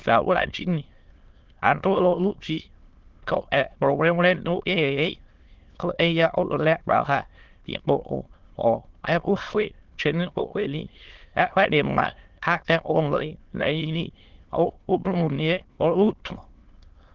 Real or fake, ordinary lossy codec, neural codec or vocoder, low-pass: fake; Opus, 16 kbps; autoencoder, 22.05 kHz, a latent of 192 numbers a frame, VITS, trained on many speakers; 7.2 kHz